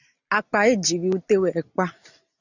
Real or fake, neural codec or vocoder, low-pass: real; none; 7.2 kHz